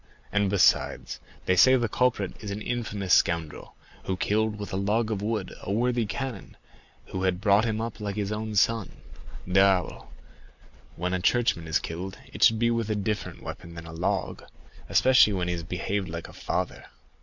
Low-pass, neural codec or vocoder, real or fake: 7.2 kHz; none; real